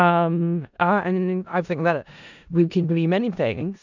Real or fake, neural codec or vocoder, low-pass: fake; codec, 16 kHz in and 24 kHz out, 0.4 kbps, LongCat-Audio-Codec, four codebook decoder; 7.2 kHz